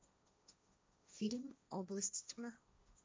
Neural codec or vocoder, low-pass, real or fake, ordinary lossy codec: codec, 16 kHz, 1.1 kbps, Voila-Tokenizer; none; fake; none